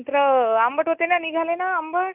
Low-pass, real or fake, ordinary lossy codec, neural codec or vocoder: 3.6 kHz; real; none; none